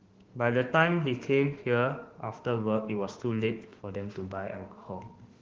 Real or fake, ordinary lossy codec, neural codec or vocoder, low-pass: fake; Opus, 16 kbps; autoencoder, 48 kHz, 32 numbers a frame, DAC-VAE, trained on Japanese speech; 7.2 kHz